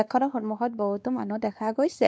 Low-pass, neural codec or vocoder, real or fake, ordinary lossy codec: none; codec, 16 kHz, 2 kbps, X-Codec, WavLM features, trained on Multilingual LibriSpeech; fake; none